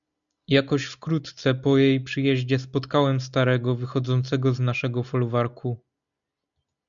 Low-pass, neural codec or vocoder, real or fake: 7.2 kHz; none; real